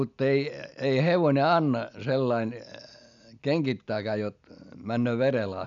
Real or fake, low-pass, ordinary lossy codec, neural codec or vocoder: real; 7.2 kHz; none; none